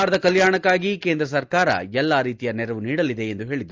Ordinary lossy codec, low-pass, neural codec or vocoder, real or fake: Opus, 32 kbps; 7.2 kHz; none; real